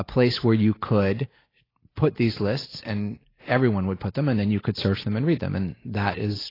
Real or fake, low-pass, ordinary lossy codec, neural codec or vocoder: real; 5.4 kHz; AAC, 24 kbps; none